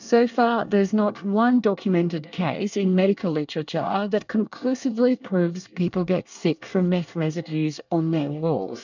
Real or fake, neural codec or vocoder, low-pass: fake; codec, 24 kHz, 1 kbps, SNAC; 7.2 kHz